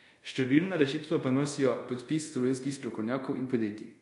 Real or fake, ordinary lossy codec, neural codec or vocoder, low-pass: fake; MP3, 64 kbps; codec, 24 kHz, 0.5 kbps, DualCodec; 10.8 kHz